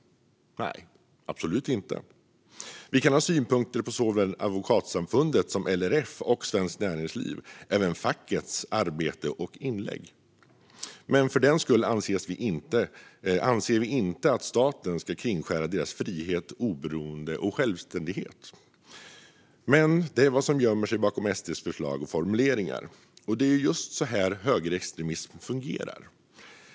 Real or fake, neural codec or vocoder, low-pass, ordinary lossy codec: real; none; none; none